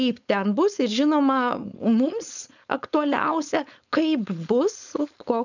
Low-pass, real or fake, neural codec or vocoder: 7.2 kHz; fake; codec, 16 kHz, 4.8 kbps, FACodec